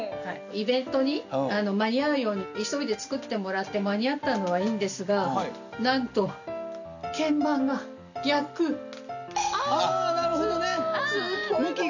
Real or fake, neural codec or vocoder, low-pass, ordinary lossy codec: real; none; 7.2 kHz; none